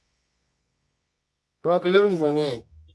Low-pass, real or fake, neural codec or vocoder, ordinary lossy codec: none; fake; codec, 24 kHz, 0.9 kbps, WavTokenizer, medium music audio release; none